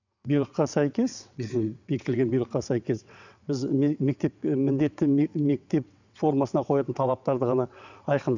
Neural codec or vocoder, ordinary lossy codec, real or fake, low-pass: vocoder, 22.05 kHz, 80 mel bands, WaveNeXt; none; fake; 7.2 kHz